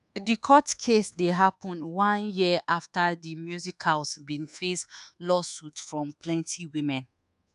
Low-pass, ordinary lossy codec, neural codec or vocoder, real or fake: 10.8 kHz; none; codec, 24 kHz, 1.2 kbps, DualCodec; fake